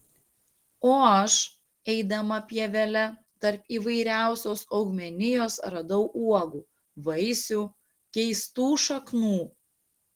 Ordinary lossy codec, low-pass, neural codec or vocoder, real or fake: Opus, 16 kbps; 19.8 kHz; none; real